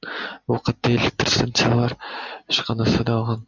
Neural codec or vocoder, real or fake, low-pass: none; real; 7.2 kHz